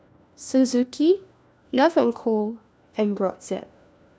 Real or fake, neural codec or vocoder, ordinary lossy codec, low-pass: fake; codec, 16 kHz, 1 kbps, FunCodec, trained on LibriTTS, 50 frames a second; none; none